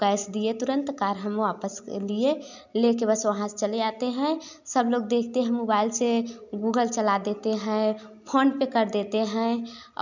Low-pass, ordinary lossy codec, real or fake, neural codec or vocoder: 7.2 kHz; none; real; none